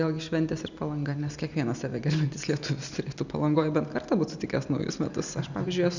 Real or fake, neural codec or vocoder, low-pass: real; none; 7.2 kHz